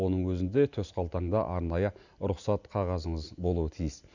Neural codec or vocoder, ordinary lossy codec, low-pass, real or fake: none; none; 7.2 kHz; real